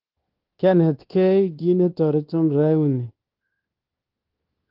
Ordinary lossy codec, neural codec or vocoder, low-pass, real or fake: Opus, 16 kbps; codec, 24 kHz, 1.2 kbps, DualCodec; 5.4 kHz; fake